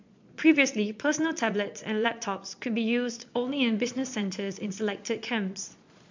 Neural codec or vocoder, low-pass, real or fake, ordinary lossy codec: vocoder, 22.05 kHz, 80 mel bands, Vocos; 7.2 kHz; fake; MP3, 64 kbps